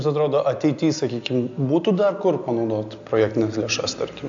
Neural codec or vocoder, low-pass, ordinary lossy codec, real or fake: none; 7.2 kHz; AAC, 64 kbps; real